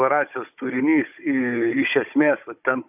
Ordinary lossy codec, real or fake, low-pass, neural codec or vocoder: AAC, 32 kbps; fake; 3.6 kHz; codec, 16 kHz, 16 kbps, FunCodec, trained on Chinese and English, 50 frames a second